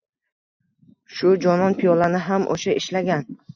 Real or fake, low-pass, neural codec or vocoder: real; 7.2 kHz; none